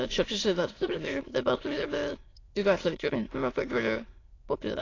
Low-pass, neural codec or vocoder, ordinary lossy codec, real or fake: 7.2 kHz; autoencoder, 22.05 kHz, a latent of 192 numbers a frame, VITS, trained on many speakers; AAC, 32 kbps; fake